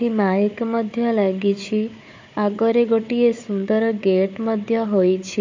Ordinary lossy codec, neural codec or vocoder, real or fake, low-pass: MP3, 48 kbps; codec, 16 kHz, 4 kbps, FunCodec, trained on Chinese and English, 50 frames a second; fake; 7.2 kHz